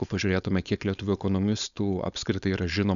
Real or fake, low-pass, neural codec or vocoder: fake; 7.2 kHz; codec, 16 kHz, 4.8 kbps, FACodec